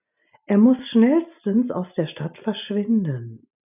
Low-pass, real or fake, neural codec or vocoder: 3.6 kHz; real; none